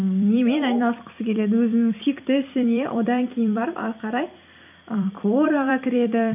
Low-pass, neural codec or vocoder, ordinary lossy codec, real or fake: 3.6 kHz; vocoder, 44.1 kHz, 128 mel bands every 256 samples, BigVGAN v2; none; fake